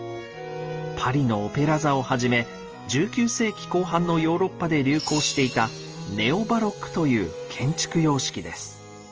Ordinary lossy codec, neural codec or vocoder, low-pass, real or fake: Opus, 24 kbps; none; 7.2 kHz; real